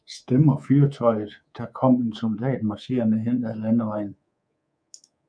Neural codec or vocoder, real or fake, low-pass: codec, 24 kHz, 3.1 kbps, DualCodec; fake; 9.9 kHz